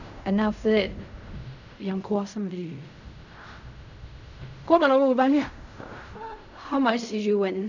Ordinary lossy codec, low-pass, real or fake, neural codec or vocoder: none; 7.2 kHz; fake; codec, 16 kHz in and 24 kHz out, 0.4 kbps, LongCat-Audio-Codec, fine tuned four codebook decoder